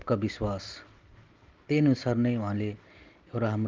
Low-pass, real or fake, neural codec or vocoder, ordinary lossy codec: 7.2 kHz; real; none; Opus, 32 kbps